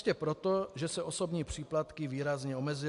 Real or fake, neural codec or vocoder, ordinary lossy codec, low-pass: real; none; AAC, 64 kbps; 10.8 kHz